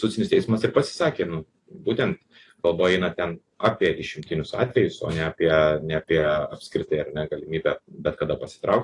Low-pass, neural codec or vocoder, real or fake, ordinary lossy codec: 10.8 kHz; none; real; AAC, 48 kbps